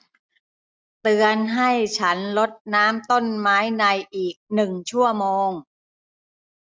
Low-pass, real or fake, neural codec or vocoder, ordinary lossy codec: none; real; none; none